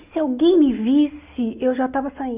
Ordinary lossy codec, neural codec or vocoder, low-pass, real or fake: Opus, 64 kbps; none; 3.6 kHz; real